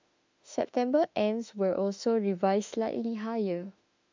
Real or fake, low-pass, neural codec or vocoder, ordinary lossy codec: fake; 7.2 kHz; autoencoder, 48 kHz, 32 numbers a frame, DAC-VAE, trained on Japanese speech; MP3, 64 kbps